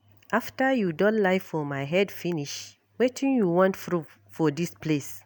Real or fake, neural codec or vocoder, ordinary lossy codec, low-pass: real; none; none; none